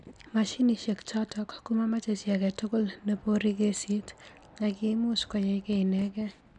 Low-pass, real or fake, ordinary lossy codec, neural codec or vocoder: 9.9 kHz; real; none; none